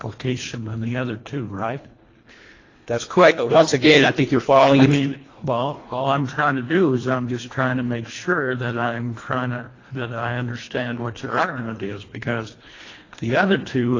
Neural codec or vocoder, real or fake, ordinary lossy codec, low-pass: codec, 24 kHz, 1.5 kbps, HILCodec; fake; AAC, 32 kbps; 7.2 kHz